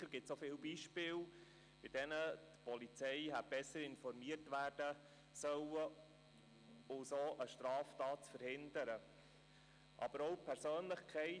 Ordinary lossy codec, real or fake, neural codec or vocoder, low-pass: none; real; none; 9.9 kHz